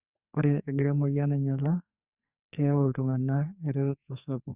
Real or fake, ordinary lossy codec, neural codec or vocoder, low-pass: fake; Opus, 64 kbps; codec, 44.1 kHz, 2.6 kbps, SNAC; 3.6 kHz